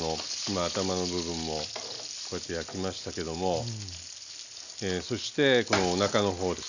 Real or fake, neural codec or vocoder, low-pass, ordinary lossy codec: real; none; 7.2 kHz; none